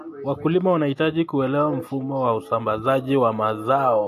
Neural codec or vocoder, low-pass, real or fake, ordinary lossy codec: none; 14.4 kHz; real; AAC, 64 kbps